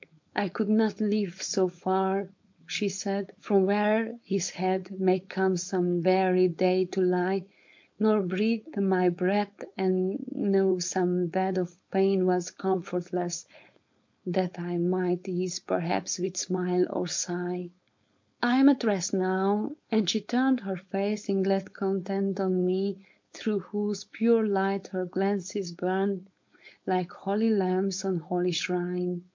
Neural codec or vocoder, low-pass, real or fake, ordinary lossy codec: codec, 16 kHz, 4.8 kbps, FACodec; 7.2 kHz; fake; MP3, 48 kbps